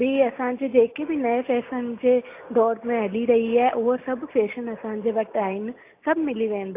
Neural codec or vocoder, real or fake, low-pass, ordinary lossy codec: none; real; 3.6 kHz; AAC, 24 kbps